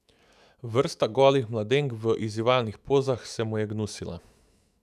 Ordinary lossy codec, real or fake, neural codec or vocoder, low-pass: none; fake; autoencoder, 48 kHz, 128 numbers a frame, DAC-VAE, trained on Japanese speech; 14.4 kHz